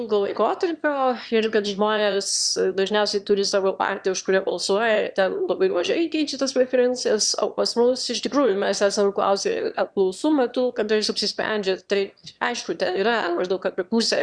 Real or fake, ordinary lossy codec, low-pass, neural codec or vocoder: fake; Opus, 64 kbps; 9.9 kHz; autoencoder, 22.05 kHz, a latent of 192 numbers a frame, VITS, trained on one speaker